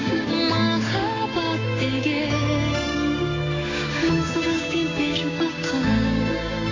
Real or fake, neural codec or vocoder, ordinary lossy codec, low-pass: fake; codec, 16 kHz, 6 kbps, DAC; AAC, 32 kbps; 7.2 kHz